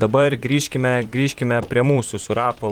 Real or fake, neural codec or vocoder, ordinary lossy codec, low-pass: fake; vocoder, 44.1 kHz, 128 mel bands, Pupu-Vocoder; Opus, 24 kbps; 19.8 kHz